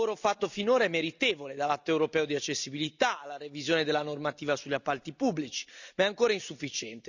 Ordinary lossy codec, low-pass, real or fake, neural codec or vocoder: none; 7.2 kHz; real; none